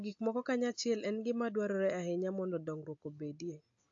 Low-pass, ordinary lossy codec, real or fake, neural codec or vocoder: 7.2 kHz; AAC, 48 kbps; real; none